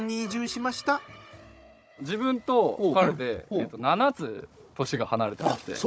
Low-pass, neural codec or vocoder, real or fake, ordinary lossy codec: none; codec, 16 kHz, 16 kbps, FunCodec, trained on Chinese and English, 50 frames a second; fake; none